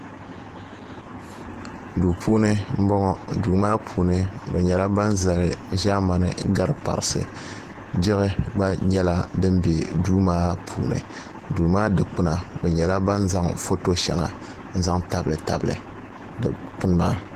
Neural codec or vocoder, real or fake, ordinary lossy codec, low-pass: vocoder, 48 kHz, 128 mel bands, Vocos; fake; Opus, 16 kbps; 14.4 kHz